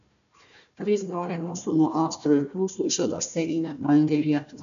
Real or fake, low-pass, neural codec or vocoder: fake; 7.2 kHz; codec, 16 kHz, 1 kbps, FunCodec, trained on Chinese and English, 50 frames a second